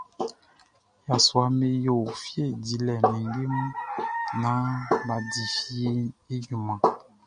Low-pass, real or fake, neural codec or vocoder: 9.9 kHz; real; none